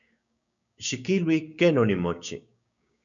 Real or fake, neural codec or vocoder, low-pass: fake; codec, 16 kHz, 6 kbps, DAC; 7.2 kHz